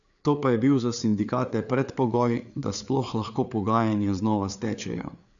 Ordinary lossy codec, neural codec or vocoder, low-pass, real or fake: none; codec, 16 kHz, 4 kbps, FreqCodec, larger model; 7.2 kHz; fake